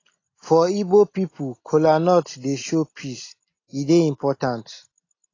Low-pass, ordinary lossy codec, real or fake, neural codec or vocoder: 7.2 kHz; AAC, 32 kbps; real; none